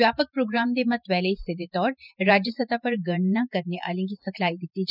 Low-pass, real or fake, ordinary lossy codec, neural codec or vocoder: 5.4 kHz; fake; none; vocoder, 44.1 kHz, 128 mel bands every 512 samples, BigVGAN v2